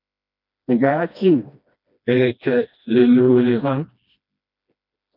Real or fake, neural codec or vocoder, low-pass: fake; codec, 16 kHz, 1 kbps, FreqCodec, smaller model; 5.4 kHz